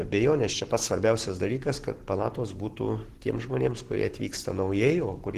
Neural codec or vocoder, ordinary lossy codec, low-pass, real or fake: codec, 44.1 kHz, 7.8 kbps, Pupu-Codec; Opus, 16 kbps; 14.4 kHz; fake